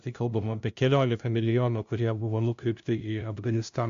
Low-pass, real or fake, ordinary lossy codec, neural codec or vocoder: 7.2 kHz; fake; AAC, 64 kbps; codec, 16 kHz, 0.5 kbps, FunCodec, trained on LibriTTS, 25 frames a second